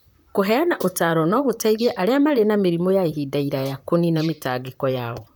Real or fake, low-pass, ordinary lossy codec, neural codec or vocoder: fake; none; none; vocoder, 44.1 kHz, 128 mel bands, Pupu-Vocoder